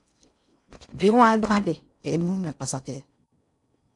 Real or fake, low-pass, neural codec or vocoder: fake; 10.8 kHz; codec, 16 kHz in and 24 kHz out, 0.6 kbps, FocalCodec, streaming, 4096 codes